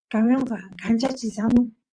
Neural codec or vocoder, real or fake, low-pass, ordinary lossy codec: vocoder, 22.05 kHz, 80 mel bands, WaveNeXt; fake; 9.9 kHz; Opus, 64 kbps